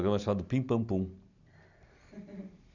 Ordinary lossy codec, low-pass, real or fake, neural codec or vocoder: none; 7.2 kHz; real; none